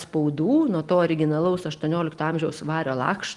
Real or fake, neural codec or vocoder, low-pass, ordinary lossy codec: real; none; 10.8 kHz; Opus, 24 kbps